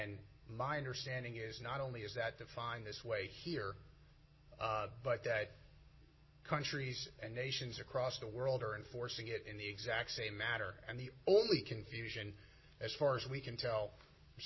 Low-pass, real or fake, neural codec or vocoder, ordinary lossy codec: 7.2 kHz; real; none; MP3, 24 kbps